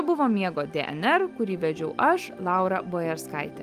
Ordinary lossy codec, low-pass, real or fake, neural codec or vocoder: Opus, 32 kbps; 14.4 kHz; real; none